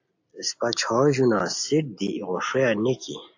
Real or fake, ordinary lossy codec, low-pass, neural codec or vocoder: real; AAC, 48 kbps; 7.2 kHz; none